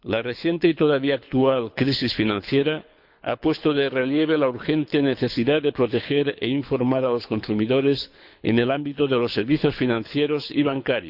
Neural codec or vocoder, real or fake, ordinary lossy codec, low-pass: codec, 24 kHz, 6 kbps, HILCodec; fake; none; 5.4 kHz